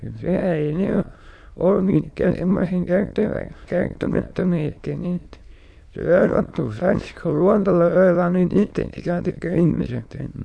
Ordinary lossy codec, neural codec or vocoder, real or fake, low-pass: none; autoencoder, 22.05 kHz, a latent of 192 numbers a frame, VITS, trained on many speakers; fake; none